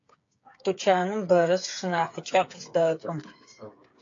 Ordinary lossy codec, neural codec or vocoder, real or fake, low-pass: AAC, 48 kbps; codec, 16 kHz, 8 kbps, FreqCodec, smaller model; fake; 7.2 kHz